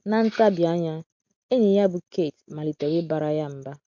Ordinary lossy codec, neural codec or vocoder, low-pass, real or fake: MP3, 48 kbps; none; 7.2 kHz; real